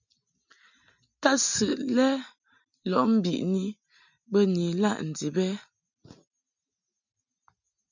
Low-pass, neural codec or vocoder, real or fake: 7.2 kHz; none; real